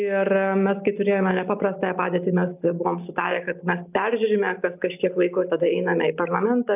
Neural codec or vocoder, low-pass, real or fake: none; 3.6 kHz; real